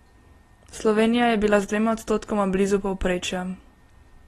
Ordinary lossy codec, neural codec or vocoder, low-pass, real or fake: AAC, 32 kbps; none; 19.8 kHz; real